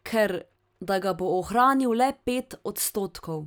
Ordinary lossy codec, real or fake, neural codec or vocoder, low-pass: none; real; none; none